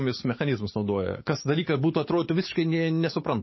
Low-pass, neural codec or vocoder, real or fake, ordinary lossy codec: 7.2 kHz; codec, 44.1 kHz, 7.8 kbps, DAC; fake; MP3, 24 kbps